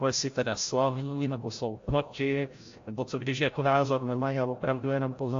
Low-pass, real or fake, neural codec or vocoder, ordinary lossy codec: 7.2 kHz; fake; codec, 16 kHz, 0.5 kbps, FreqCodec, larger model; AAC, 48 kbps